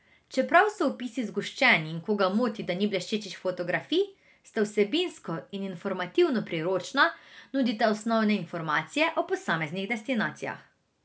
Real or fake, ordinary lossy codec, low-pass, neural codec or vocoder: real; none; none; none